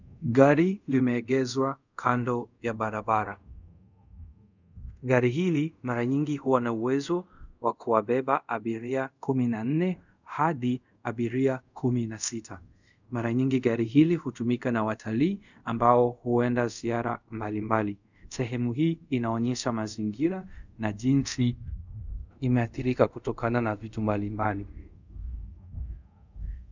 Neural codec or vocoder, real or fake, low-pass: codec, 24 kHz, 0.5 kbps, DualCodec; fake; 7.2 kHz